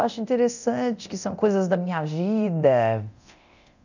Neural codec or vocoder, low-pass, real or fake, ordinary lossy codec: codec, 24 kHz, 0.9 kbps, DualCodec; 7.2 kHz; fake; none